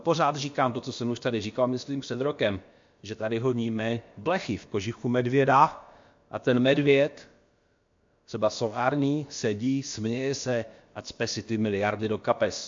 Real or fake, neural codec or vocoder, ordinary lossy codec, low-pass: fake; codec, 16 kHz, about 1 kbps, DyCAST, with the encoder's durations; MP3, 48 kbps; 7.2 kHz